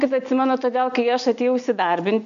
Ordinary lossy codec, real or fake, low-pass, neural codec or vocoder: AAC, 96 kbps; real; 7.2 kHz; none